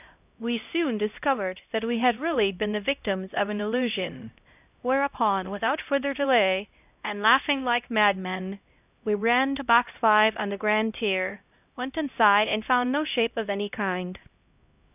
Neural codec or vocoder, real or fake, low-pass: codec, 16 kHz, 0.5 kbps, X-Codec, HuBERT features, trained on LibriSpeech; fake; 3.6 kHz